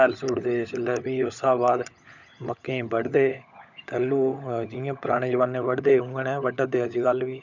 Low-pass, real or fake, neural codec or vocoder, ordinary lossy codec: 7.2 kHz; fake; codec, 16 kHz, 16 kbps, FunCodec, trained on LibriTTS, 50 frames a second; none